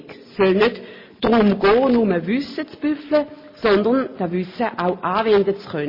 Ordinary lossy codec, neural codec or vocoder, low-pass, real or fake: MP3, 24 kbps; none; 5.4 kHz; real